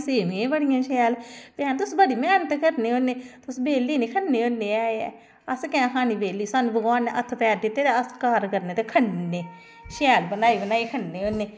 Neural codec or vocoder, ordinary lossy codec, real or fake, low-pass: none; none; real; none